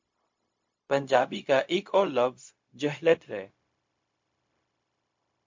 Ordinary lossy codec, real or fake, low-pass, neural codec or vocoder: MP3, 48 kbps; fake; 7.2 kHz; codec, 16 kHz, 0.4 kbps, LongCat-Audio-Codec